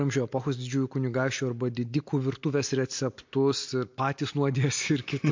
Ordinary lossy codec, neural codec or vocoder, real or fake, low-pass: MP3, 48 kbps; none; real; 7.2 kHz